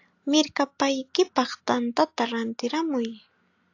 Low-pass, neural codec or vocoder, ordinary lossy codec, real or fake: 7.2 kHz; none; AAC, 48 kbps; real